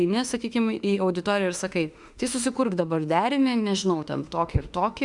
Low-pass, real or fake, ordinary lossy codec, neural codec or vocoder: 10.8 kHz; fake; Opus, 64 kbps; autoencoder, 48 kHz, 32 numbers a frame, DAC-VAE, trained on Japanese speech